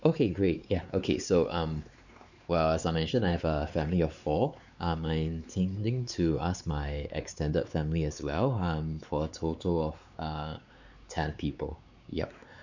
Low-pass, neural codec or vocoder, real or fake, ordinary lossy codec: 7.2 kHz; codec, 16 kHz, 4 kbps, X-Codec, WavLM features, trained on Multilingual LibriSpeech; fake; none